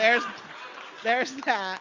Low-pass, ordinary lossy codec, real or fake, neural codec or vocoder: 7.2 kHz; MP3, 64 kbps; real; none